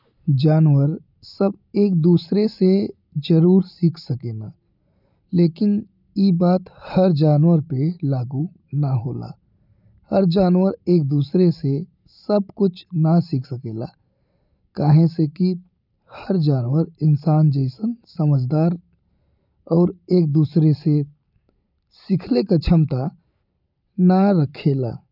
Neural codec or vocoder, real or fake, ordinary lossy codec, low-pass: none; real; none; 5.4 kHz